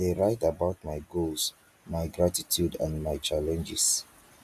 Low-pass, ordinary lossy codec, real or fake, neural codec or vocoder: 14.4 kHz; AAC, 96 kbps; real; none